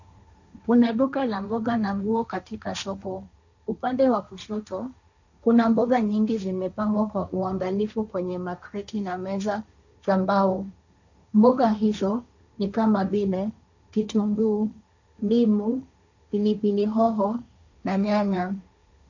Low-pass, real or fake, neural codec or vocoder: 7.2 kHz; fake; codec, 16 kHz, 1.1 kbps, Voila-Tokenizer